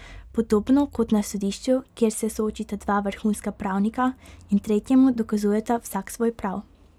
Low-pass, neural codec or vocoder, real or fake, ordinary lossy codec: 19.8 kHz; none; real; none